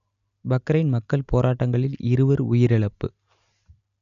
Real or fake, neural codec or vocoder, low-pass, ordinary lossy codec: real; none; 7.2 kHz; none